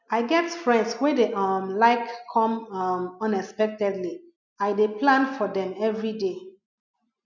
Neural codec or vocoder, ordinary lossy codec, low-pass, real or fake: none; none; 7.2 kHz; real